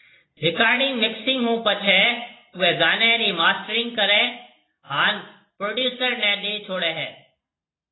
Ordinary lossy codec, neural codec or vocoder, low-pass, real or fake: AAC, 16 kbps; none; 7.2 kHz; real